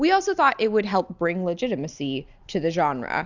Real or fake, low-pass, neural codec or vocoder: real; 7.2 kHz; none